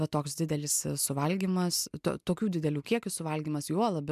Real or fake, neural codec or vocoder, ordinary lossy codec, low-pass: real; none; MP3, 96 kbps; 14.4 kHz